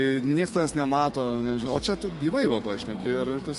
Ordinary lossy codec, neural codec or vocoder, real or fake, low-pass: MP3, 48 kbps; codec, 32 kHz, 1.9 kbps, SNAC; fake; 14.4 kHz